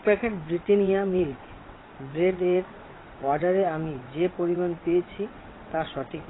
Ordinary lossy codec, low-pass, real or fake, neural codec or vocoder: AAC, 16 kbps; 7.2 kHz; fake; vocoder, 22.05 kHz, 80 mel bands, WaveNeXt